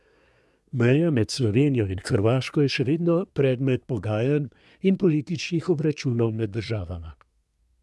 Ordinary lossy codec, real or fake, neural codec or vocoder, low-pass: none; fake; codec, 24 kHz, 1 kbps, SNAC; none